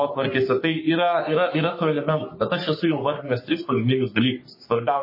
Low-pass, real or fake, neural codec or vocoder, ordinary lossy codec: 5.4 kHz; fake; codec, 44.1 kHz, 3.4 kbps, Pupu-Codec; MP3, 24 kbps